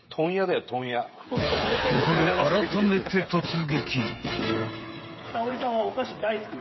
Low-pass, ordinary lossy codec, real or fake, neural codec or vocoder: 7.2 kHz; MP3, 24 kbps; fake; codec, 16 kHz, 8 kbps, FreqCodec, smaller model